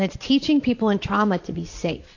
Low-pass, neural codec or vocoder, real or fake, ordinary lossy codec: 7.2 kHz; vocoder, 44.1 kHz, 80 mel bands, Vocos; fake; AAC, 48 kbps